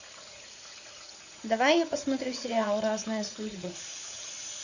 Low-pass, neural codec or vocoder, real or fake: 7.2 kHz; vocoder, 44.1 kHz, 80 mel bands, Vocos; fake